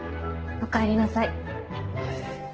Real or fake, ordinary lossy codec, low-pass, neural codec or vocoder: real; Opus, 16 kbps; 7.2 kHz; none